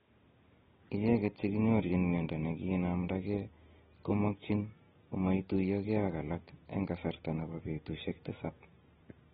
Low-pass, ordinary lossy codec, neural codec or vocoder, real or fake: 19.8 kHz; AAC, 16 kbps; none; real